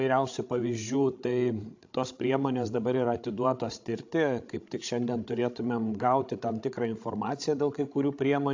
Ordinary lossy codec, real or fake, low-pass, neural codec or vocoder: AAC, 48 kbps; fake; 7.2 kHz; codec, 16 kHz, 16 kbps, FreqCodec, larger model